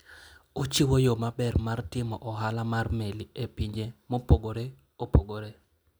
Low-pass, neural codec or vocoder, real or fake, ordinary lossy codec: none; none; real; none